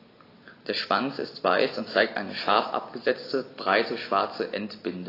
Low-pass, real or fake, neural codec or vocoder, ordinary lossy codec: 5.4 kHz; real; none; AAC, 24 kbps